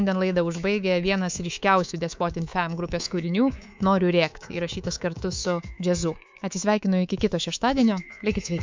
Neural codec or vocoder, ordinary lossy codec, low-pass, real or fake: codec, 24 kHz, 3.1 kbps, DualCodec; MP3, 64 kbps; 7.2 kHz; fake